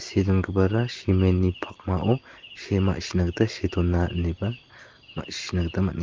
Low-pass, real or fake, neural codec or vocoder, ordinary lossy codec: 7.2 kHz; real; none; Opus, 16 kbps